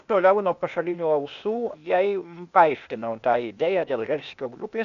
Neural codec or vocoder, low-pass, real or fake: codec, 16 kHz, 0.8 kbps, ZipCodec; 7.2 kHz; fake